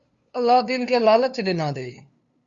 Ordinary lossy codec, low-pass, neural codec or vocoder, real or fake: Opus, 64 kbps; 7.2 kHz; codec, 16 kHz, 2 kbps, FunCodec, trained on LibriTTS, 25 frames a second; fake